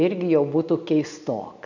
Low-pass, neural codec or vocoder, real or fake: 7.2 kHz; none; real